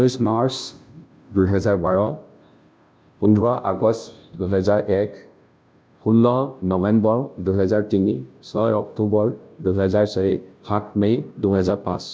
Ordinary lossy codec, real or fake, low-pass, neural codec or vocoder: none; fake; none; codec, 16 kHz, 0.5 kbps, FunCodec, trained on Chinese and English, 25 frames a second